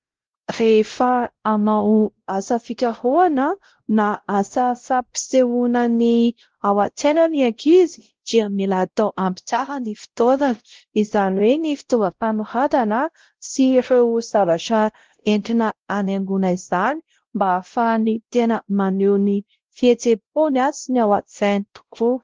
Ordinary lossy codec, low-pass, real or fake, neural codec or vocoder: Opus, 16 kbps; 7.2 kHz; fake; codec, 16 kHz, 0.5 kbps, X-Codec, WavLM features, trained on Multilingual LibriSpeech